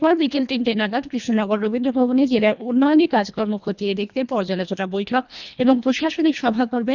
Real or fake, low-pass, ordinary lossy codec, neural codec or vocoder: fake; 7.2 kHz; none; codec, 24 kHz, 1.5 kbps, HILCodec